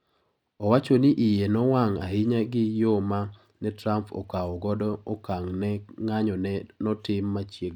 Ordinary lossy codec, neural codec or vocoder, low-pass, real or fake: none; none; 19.8 kHz; real